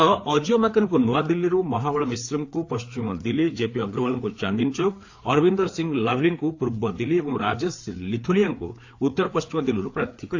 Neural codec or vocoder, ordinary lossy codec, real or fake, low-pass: codec, 16 kHz, 4 kbps, FreqCodec, larger model; AAC, 48 kbps; fake; 7.2 kHz